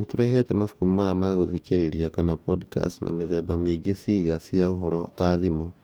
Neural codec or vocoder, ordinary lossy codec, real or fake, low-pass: codec, 44.1 kHz, 2.6 kbps, DAC; none; fake; none